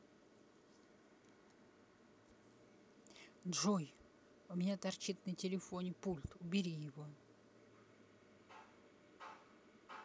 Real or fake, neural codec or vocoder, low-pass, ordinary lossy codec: real; none; none; none